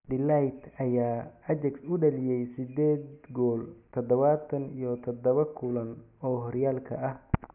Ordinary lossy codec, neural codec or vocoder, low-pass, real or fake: none; none; 3.6 kHz; real